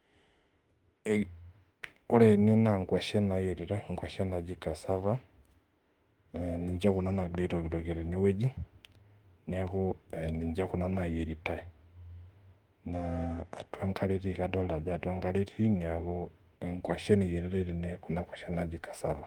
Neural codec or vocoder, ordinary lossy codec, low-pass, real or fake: autoencoder, 48 kHz, 32 numbers a frame, DAC-VAE, trained on Japanese speech; Opus, 32 kbps; 19.8 kHz; fake